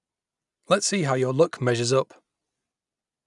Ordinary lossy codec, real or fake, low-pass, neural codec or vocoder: none; real; 10.8 kHz; none